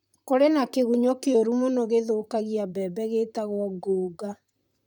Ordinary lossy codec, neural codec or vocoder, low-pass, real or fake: none; vocoder, 44.1 kHz, 128 mel bands, Pupu-Vocoder; 19.8 kHz; fake